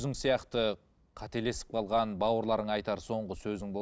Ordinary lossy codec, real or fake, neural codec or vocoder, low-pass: none; real; none; none